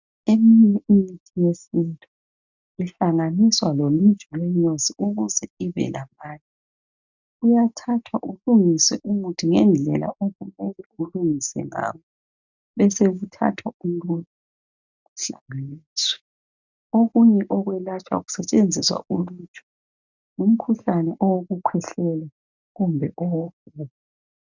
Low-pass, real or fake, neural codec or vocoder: 7.2 kHz; real; none